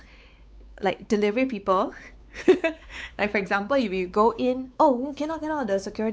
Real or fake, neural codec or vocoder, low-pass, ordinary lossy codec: fake; codec, 16 kHz, 4 kbps, X-Codec, WavLM features, trained on Multilingual LibriSpeech; none; none